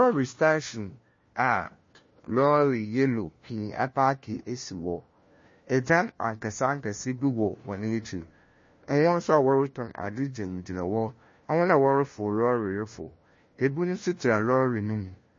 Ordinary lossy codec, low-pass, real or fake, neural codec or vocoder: MP3, 32 kbps; 7.2 kHz; fake; codec, 16 kHz, 1 kbps, FunCodec, trained on LibriTTS, 50 frames a second